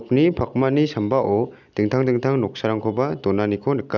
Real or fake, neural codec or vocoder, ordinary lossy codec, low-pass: real; none; none; 7.2 kHz